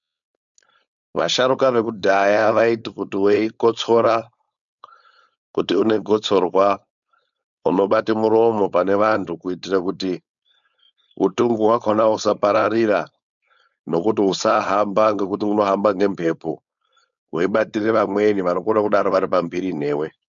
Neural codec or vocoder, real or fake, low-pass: codec, 16 kHz, 4.8 kbps, FACodec; fake; 7.2 kHz